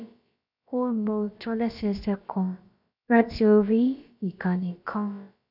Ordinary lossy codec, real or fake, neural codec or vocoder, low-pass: none; fake; codec, 16 kHz, about 1 kbps, DyCAST, with the encoder's durations; 5.4 kHz